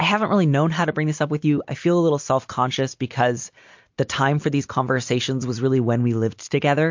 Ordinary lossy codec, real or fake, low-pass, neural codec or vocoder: MP3, 48 kbps; real; 7.2 kHz; none